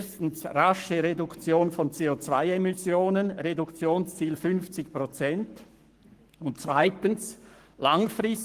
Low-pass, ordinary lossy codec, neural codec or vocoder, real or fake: 14.4 kHz; Opus, 24 kbps; codec, 44.1 kHz, 7.8 kbps, Pupu-Codec; fake